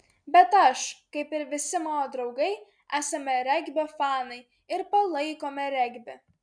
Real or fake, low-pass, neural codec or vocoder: real; 9.9 kHz; none